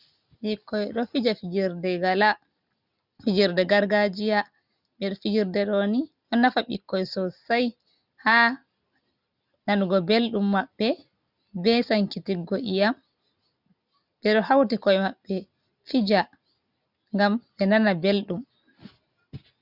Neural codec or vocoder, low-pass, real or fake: none; 5.4 kHz; real